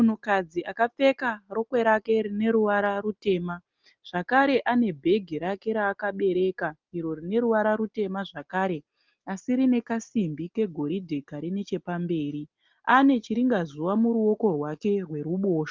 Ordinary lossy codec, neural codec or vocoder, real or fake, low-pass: Opus, 24 kbps; none; real; 7.2 kHz